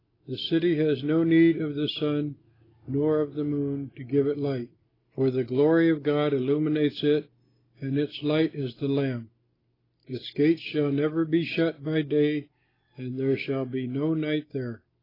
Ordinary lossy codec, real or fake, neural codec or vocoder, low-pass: AAC, 24 kbps; real; none; 5.4 kHz